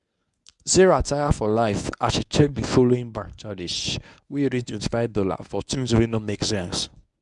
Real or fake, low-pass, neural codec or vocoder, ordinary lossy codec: fake; 10.8 kHz; codec, 24 kHz, 0.9 kbps, WavTokenizer, medium speech release version 1; none